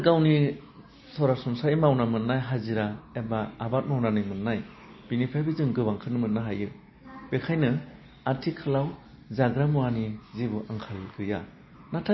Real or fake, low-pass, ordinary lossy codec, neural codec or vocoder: real; 7.2 kHz; MP3, 24 kbps; none